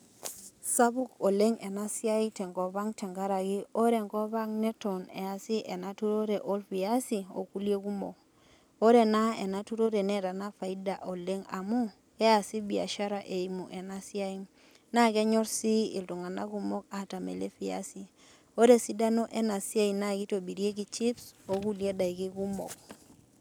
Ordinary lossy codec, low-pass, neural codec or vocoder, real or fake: none; none; none; real